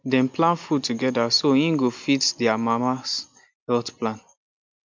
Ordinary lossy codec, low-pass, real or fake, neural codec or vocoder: MP3, 64 kbps; 7.2 kHz; real; none